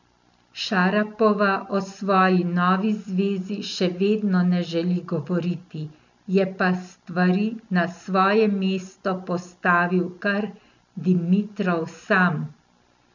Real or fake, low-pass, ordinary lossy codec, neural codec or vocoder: real; 7.2 kHz; none; none